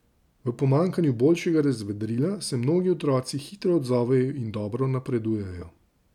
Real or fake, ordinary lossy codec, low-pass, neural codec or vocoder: real; none; 19.8 kHz; none